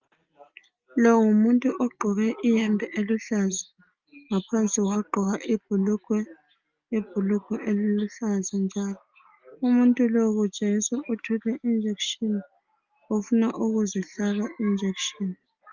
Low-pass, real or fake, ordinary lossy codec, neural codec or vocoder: 7.2 kHz; real; Opus, 24 kbps; none